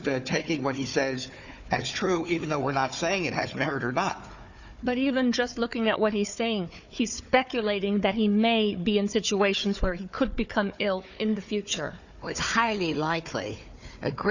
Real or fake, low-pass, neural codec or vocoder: fake; 7.2 kHz; codec, 16 kHz, 4 kbps, FunCodec, trained on Chinese and English, 50 frames a second